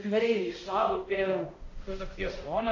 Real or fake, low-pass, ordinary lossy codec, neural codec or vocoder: fake; 7.2 kHz; AAC, 48 kbps; codec, 16 kHz, 0.5 kbps, X-Codec, HuBERT features, trained on balanced general audio